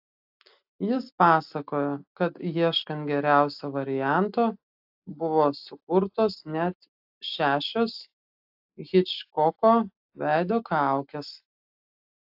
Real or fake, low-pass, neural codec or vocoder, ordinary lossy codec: real; 5.4 kHz; none; AAC, 48 kbps